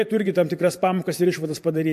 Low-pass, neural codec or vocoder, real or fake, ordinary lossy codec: 14.4 kHz; vocoder, 44.1 kHz, 128 mel bands every 512 samples, BigVGAN v2; fake; MP3, 64 kbps